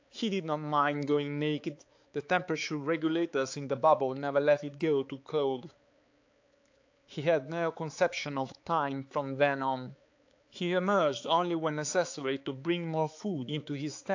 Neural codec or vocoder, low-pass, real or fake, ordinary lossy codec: codec, 16 kHz, 4 kbps, X-Codec, HuBERT features, trained on balanced general audio; 7.2 kHz; fake; AAC, 48 kbps